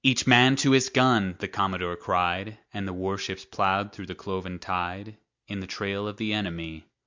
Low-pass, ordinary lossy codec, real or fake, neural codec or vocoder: 7.2 kHz; MP3, 64 kbps; real; none